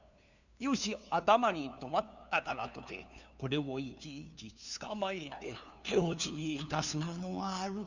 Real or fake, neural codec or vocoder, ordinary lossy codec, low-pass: fake; codec, 16 kHz, 2 kbps, FunCodec, trained on LibriTTS, 25 frames a second; none; 7.2 kHz